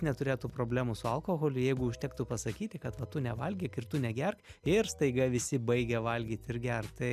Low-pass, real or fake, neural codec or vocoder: 14.4 kHz; real; none